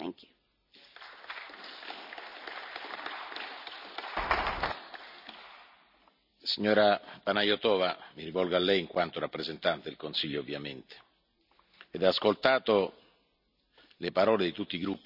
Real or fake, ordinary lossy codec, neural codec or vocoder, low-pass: real; none; none; 5.4 kHz